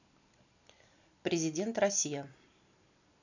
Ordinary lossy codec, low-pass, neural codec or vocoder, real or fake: none; 7.2 kHz; none; real